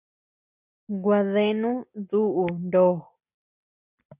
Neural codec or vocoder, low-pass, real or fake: none; 3.6 kHz; real